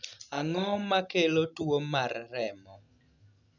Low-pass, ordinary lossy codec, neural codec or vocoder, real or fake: 7.2 kHz; none; none; real